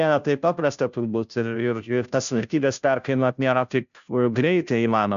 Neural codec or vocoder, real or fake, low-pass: codec, 16 kHz, 0.5 kbps, FunCodec, trained on Chinese and English, 25 frames a second; fake; 7.2 kHz